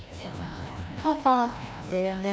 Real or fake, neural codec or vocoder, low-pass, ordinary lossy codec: fake; codec, 16 kHz, 1 kbps, FreqCodec, larger model; none; none